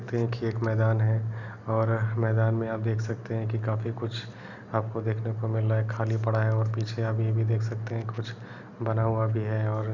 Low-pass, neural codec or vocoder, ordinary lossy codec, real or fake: 7.2 kHz; none; none; real